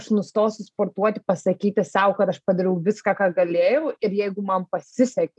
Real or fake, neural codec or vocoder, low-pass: real; none; 10.8 kHz